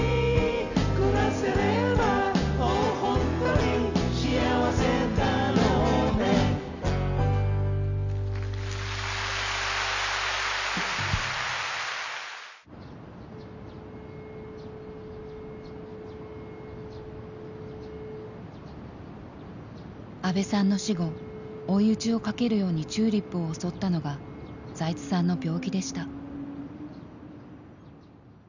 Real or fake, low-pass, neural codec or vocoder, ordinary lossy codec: real; 7.2 kHz; none; none